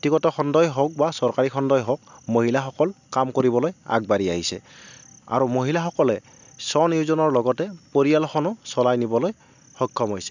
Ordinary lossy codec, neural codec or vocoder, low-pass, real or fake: none; none; 7.2 kHz; real